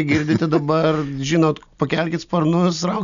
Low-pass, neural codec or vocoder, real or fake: 7.2 kHz; none; real